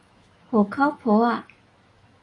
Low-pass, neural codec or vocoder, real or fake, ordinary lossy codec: 10.8 kHz; autoencoder, 48 kHz, 128 numbers a frame, DAC-VAE, trained on Japanese speech; fake; AAC, 32 kbps